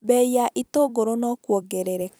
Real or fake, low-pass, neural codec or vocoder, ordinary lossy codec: fake; none; vocoder, 44.1 kHz, 128 mel bands every 256 samples, BigVGAN v2; none